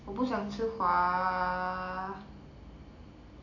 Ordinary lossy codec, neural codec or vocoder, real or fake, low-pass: none; none; real; 7.2 kHz